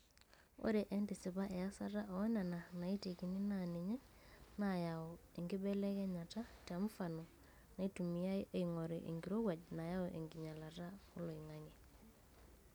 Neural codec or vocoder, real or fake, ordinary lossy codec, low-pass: none; real; none; none